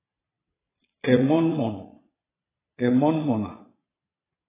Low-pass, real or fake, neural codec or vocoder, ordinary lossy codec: 3.6 kHz; fake; vocoder, 22.05 kHz, 80 mel bands, Vocos; AAC, 16 kbps